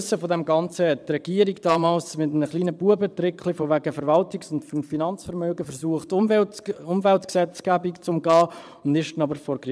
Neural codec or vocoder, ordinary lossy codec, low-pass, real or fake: vocoder, 22.05 kHz, 80 mel bands, Vocos; none; none; fake